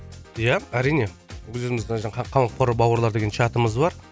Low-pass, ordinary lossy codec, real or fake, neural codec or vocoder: none; none; real; none